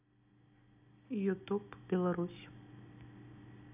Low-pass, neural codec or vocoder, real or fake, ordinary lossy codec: 3.6 kHz; none; real; none